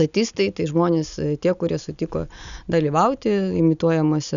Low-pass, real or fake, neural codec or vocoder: 7.2 kHz; real; none